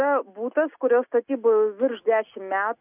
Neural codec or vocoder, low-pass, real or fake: none; 3.6 kHz; real